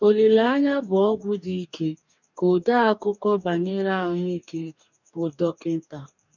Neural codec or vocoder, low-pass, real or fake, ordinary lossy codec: codec, 44.1 kHz, 2.6 kbps, DAC; 7.2 kHz; fake; none